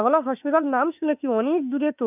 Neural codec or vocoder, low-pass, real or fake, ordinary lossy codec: autoencoder, 48 kHz, 32 numbers a frame, DAC-VAE, trained on Japanese speech; 3.6 kHz; fake; none